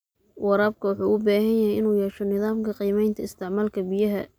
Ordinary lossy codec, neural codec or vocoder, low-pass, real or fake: none; none; none; real